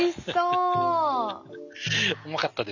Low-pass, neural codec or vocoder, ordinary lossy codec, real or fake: 7.2 kHz; none; none; real